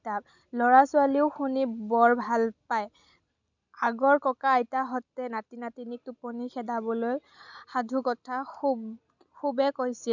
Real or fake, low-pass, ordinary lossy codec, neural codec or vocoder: real; 7.2 kHz; none; none